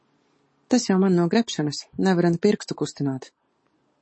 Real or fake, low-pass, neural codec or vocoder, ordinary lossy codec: real; 9.9 kHz; none; MP3, 32 kbps